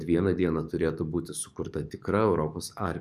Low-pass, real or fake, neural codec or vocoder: 14.4 kHz; fake; codec, 44.1 kHz, 7.8 kbps, DAC